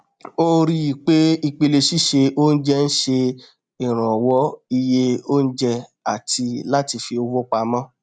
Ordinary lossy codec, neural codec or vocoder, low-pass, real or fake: none; none; 9.9 kHz; real